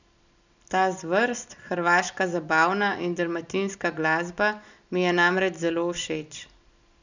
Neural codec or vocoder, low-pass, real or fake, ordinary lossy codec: none; 7.2 kHz; real; none